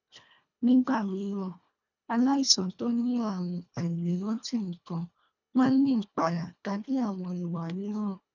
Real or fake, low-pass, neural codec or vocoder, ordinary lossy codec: fake; 7.2 kHz; codec, 24 kHz, 1.5 kbps, HILCodec; none